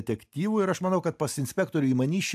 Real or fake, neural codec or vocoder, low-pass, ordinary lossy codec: real; none; 14.4 kHz; AAC, 96 kbps